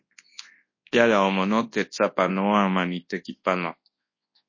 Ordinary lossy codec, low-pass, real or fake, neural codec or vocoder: MP3, 32 kbps; 7.2 kHz; fake; codec, 24 kHz, 0.9 kbps, WavTokenizer, large speech release